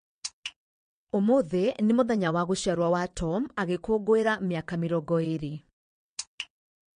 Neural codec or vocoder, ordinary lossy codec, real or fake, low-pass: vocoder, 22.05 kHz, 80 mel bands, WaveNeXt; MP3, 48 kbps; fake; 9.9 kHz